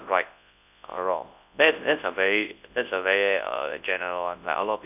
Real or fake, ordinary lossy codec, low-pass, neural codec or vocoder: fake; none; 3.6 kHz; codec, 24 kHz, 0.9 kbps, WavTokenizer, large speech release